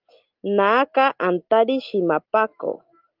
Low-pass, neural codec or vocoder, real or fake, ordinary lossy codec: 5.4 kHz; none; real; Opus, 32 kbps